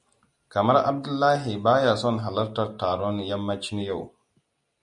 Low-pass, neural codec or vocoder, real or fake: 10.8 kHz; none; real